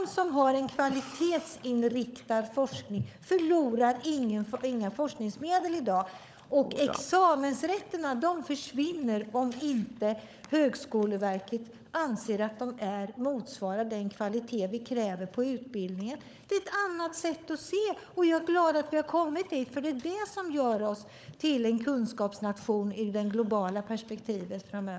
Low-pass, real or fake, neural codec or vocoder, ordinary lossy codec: none; fake; codec, 16 kHz, 16 kbps, FunCodec, trained on LibriTTS, 50 frames a second; none